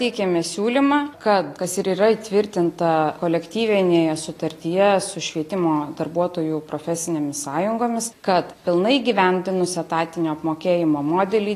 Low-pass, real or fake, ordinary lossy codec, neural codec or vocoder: 14.4 kHz; real; AAC, 48 kbps; none